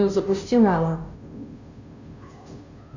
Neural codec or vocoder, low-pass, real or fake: codec, 16 kHz, 0.5 kbps, FunCodec, trained on Chinese and English, 25 frames a second; 7.2 kHz; fake